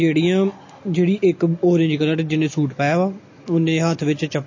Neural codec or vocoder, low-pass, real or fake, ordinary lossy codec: none; 7.2 kHz; real; MP3, 32 kbps